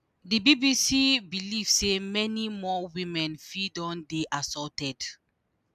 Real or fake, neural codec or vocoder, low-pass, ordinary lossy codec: real; none; 14.4 kHz; none